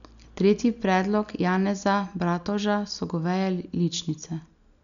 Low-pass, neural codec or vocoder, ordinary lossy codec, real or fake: 7.2 kHz; none; none; real